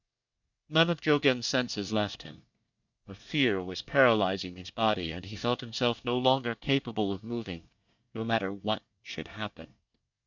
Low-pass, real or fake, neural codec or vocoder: 7.2 kHz; fake; codec, 24 kHz, 1 kbps, SNAC